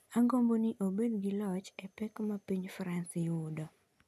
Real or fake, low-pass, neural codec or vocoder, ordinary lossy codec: real; 14.4 kHz; none; none